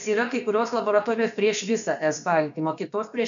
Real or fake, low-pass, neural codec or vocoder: fake; 7.2 kHz; codec, 16 kHz, about 1 kbps, DyCAST, with the encoder's durations